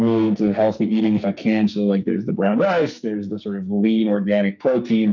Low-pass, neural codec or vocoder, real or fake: 7.2 kHz; codec, 44.1 kHz, 2.6 kbps, SNAC; fake